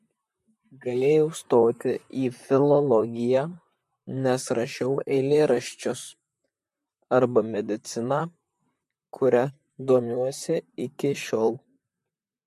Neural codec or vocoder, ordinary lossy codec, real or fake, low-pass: vocoder, 44.1 kHz, 128 mel bands, Pupu-Vocoder; MP3, 64 kbps; fake; 14.4 kHz